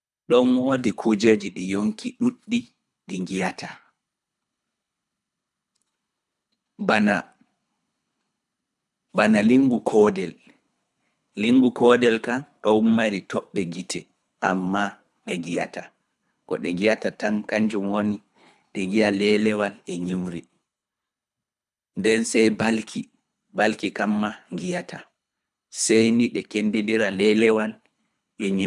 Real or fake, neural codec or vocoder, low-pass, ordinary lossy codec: fake; codec, 24 kHz, 3 kbps, HILCodec; none; none